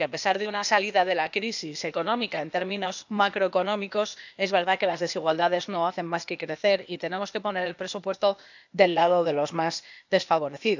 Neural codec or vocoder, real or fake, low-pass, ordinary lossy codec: codec, 16 kHz, 0.8 kbps, ZipCodec; fake; 7.2 kHz; none